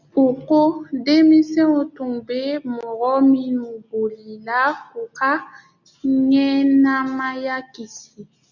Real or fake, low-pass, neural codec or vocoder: real; 7.2 kHz; none